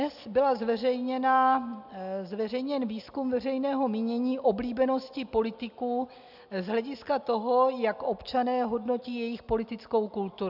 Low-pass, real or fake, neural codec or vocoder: 5.4 kHz; real; none